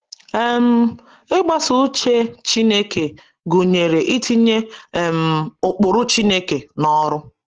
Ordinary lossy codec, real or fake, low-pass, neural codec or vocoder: Opus, 16 kbps; real; 7.2 kHz; none